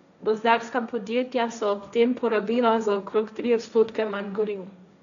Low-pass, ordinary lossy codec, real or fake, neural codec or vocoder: 7.2 kHz; none; fake; codec, 16 kHz, 1.1 kbps, Voila-Tokenizer